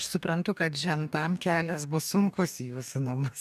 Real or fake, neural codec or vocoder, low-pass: fake; codec, 44.1 kHz, 2.6 kbps, DAC; 14.4 kHz